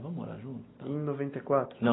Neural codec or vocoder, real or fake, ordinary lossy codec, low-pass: none; real; AAC, 16 kbps; 7.2 kHz